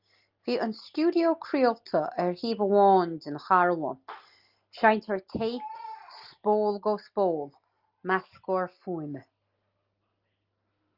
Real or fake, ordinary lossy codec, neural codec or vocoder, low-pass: real; Opus, 32 kbps; none; 5.4 kHz